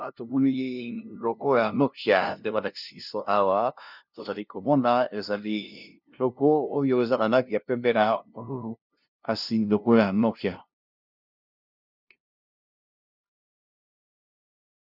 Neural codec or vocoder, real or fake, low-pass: codec, 16 kHz, 0.5 kbps, FunCodec, trained on LibriTTS, 25 frames a second; fake; 5.4 kHz